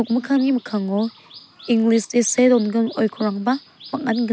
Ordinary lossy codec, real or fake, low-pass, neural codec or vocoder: none; real; none; none